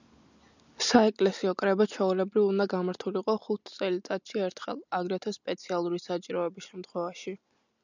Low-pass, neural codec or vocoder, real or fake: 7.2 kHz; none; real